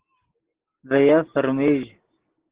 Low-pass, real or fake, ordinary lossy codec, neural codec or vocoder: 3.6 kHz; real; Opus, 16 kbps; none